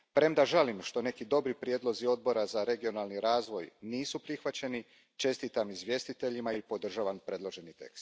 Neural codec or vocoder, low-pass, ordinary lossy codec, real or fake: none; none; none; real